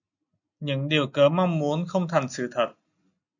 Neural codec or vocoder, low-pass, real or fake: none; 7.2 kHz; real